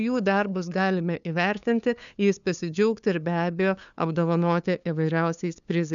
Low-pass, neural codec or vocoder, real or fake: 7.2 kHz; codec, 16 kHz, 2 kbps, FunCodec, trained on LibriTTS, 25 frames a second; fake